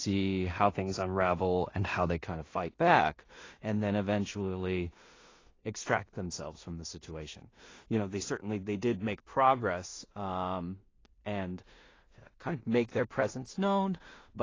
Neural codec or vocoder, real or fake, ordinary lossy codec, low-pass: codec, 16 kHz in and 24 kHz out, 0.4 kbps, LongCat-Audio-Codec, two codebook decoder; fake; AAC, 32 kbps; 7.2 kHz